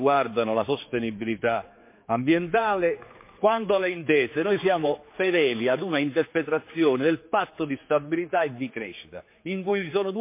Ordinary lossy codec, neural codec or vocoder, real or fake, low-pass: MP3, 24 kbps; codec, 16 kHz, 4 kbps, X-Codec, HuBERT features, trained on general audio; fake; 3.6 kHz